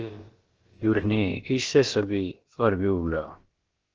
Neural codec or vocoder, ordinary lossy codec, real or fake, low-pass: codec, 16 kHz, about 1 kbps, DyCAST, with the encoder's durations; Opus, 16 kbps; fake; 7.2 kHz